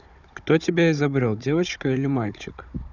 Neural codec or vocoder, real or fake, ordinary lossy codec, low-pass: codec, 16 kHz, 16 kbps, FunCodec, trained on Chinese and English, 50 frames a second; fake; none; 7.2 kHz